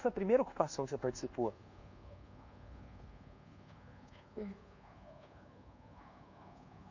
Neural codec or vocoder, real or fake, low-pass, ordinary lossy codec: codec, 24 kHz, 1.2 kbps, DualCodec; fake; 7.2 kHz; none